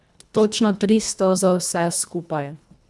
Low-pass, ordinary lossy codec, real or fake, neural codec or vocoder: none; none; fake; codec, 24 kHz, 1.5 kbps, HILCodec